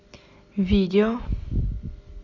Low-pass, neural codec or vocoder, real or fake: 7.2 kHz; none; real